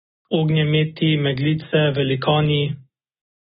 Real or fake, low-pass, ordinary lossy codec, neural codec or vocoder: real; 19.8 kHz; AAC, 16 kbps; none